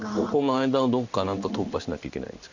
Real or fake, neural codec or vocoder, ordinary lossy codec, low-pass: fake; codec, 16 kHz in and 24 kHz out, 1 kbps, XY-Tokenizer; none; 7.2 kHz